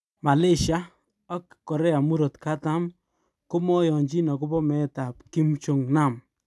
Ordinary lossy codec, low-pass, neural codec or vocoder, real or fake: none; none; none; real